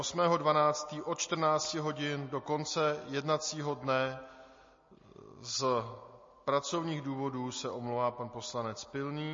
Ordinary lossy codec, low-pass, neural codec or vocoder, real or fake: MP3, 32 kbps; 7.2 kHz; none; real